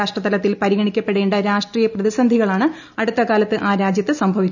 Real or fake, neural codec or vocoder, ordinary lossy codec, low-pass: real; none; none; 7.2 kHz